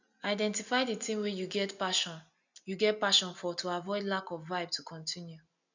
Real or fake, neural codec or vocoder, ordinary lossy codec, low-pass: real; none; none; 7.2 kHz